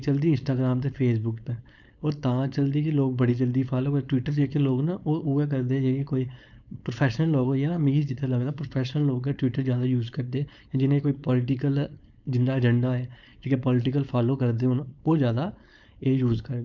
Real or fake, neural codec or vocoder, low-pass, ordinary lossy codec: fake; codec, 16 kHz, 4.8 kbps, FACodec; 7.2 kHz; none